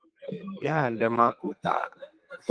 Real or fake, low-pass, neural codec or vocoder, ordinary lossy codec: fake; 9.9 kHz; codec, 32 kHz, 1.9 kbps, SNAC; Opus, 24 kbps